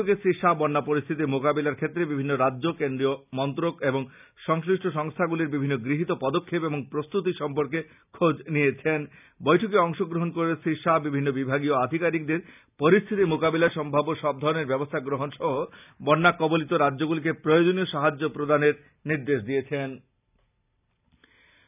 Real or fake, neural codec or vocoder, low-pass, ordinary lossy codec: real; none; 3.6 kHz; none